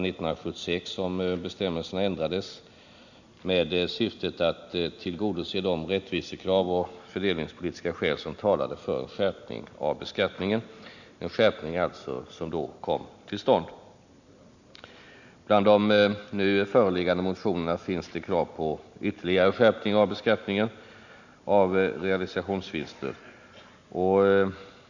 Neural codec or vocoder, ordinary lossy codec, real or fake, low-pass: none; none; real; 7.2 kHz